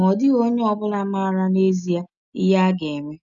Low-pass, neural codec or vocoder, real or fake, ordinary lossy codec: 7.2 kHz; none; real; none